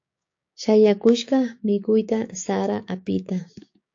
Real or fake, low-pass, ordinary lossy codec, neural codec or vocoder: fake; 7.2 kHz; AAC, 48 kbps; codec, 16 kHz, 6 kbps, DAC